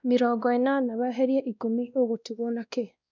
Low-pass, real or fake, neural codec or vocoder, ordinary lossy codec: 7.2 kHz; fake; codec, 16 kHz, 1 kbps, X-Codec, WavLM features, trained on Multilingual LibriSpeech; none